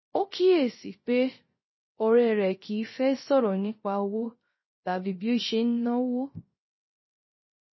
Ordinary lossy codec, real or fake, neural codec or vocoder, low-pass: MP3, 24 kbps; fake; codec, 16 kHz, 0.3 kbps, FocalCodec; 7.2 kHz